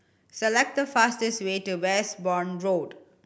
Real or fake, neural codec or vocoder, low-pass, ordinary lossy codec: real; none; none; none